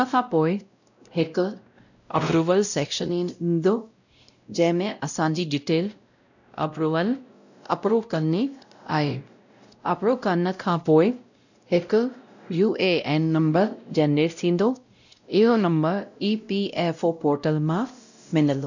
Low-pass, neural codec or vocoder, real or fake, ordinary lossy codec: 7.2 kHz; codec, 16 kHz, 0.5 kbps, X-Codec, WavLM features, trained on Multilingual LibriSpeech; fake; none